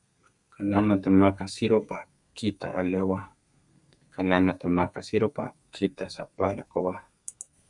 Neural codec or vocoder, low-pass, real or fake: codec, 32 kHz, 1.9 kbps, SNAC; 10.8 kHz; fake